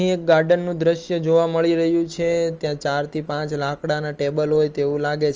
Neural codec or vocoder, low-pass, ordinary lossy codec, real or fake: none; 7.2 kHz; Opus, 24 kbps; real